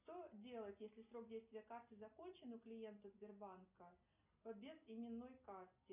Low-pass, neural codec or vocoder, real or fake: 3.6 kHz; none; real